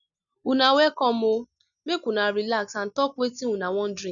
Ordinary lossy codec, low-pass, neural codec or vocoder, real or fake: none; 7.2 kHz; none; real